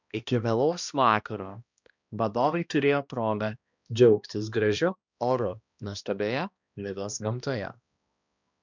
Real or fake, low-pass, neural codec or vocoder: fake; 7.2 kHz; codec, 16 kHz, 1 kbps, X-Codec, HuBERT features, trained on balanced general audio